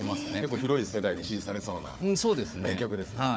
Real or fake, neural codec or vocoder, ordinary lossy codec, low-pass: fake; codec, 16 kHz, 4 kbps, FunCodec, trained on Chinese and English, 50 frames a second; none; none